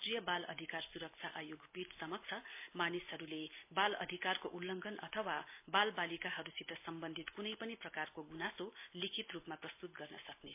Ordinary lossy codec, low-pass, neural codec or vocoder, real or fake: MP3, 24 kbps; 3.6 kHz; none; real